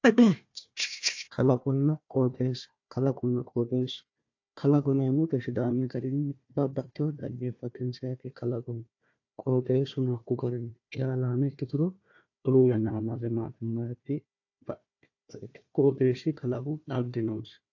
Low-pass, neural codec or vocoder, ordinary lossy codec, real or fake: 7.2 kHz; codec, 16 kHz, 1 kbps, FunCodec, trained on Chinese and English, 50 frames a second; AAC, 48 kbps; fake